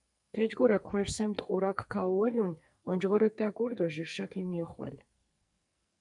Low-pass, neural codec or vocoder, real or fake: 10.8 kHz; codec, 44.1 kHz, 2.6 kbps, SNAC; fake